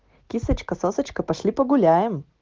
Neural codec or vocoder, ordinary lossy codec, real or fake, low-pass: none; Opus, 16 kbps; real; 7.2 kHz